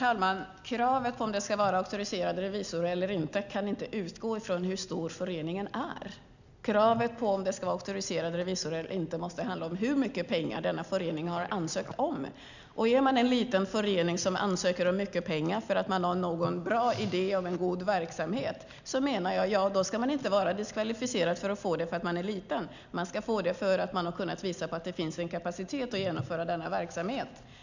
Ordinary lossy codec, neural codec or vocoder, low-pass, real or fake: none; none; 7.2 kHz; real